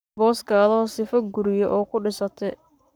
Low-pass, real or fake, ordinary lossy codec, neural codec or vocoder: none; fake; none; codec, 44.1 kHz, 7.8 kbps, Pupu-Codec